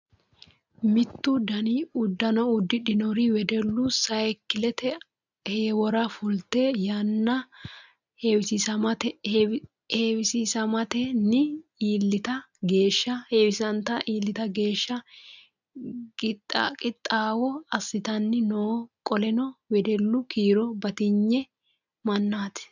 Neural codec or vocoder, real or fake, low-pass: none; real; 7.2 kHz